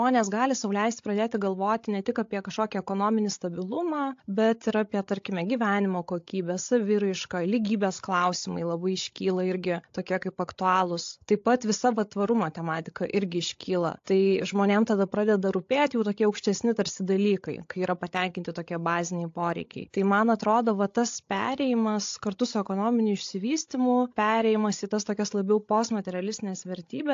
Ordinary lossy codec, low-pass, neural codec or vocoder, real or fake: AAC, 48 kbps; 7.2 kHz; codec, 16 kHz, 16 kbps, FreqCodec, larger model; fake